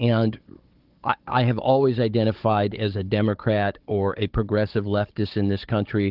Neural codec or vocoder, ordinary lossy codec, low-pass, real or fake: codec, 16 kHz, 16 kbps, FunCodec, trained on Chinese and English, 50 frames a second; Opus, 16 kbps; 5.4 kHz; fake